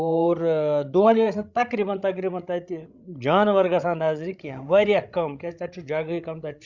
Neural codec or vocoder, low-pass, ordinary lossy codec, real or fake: codec, 16 kHz, 16 kbps, FreqCodec, larger model; 7.2 kHz; Opus, 64 kbps; fake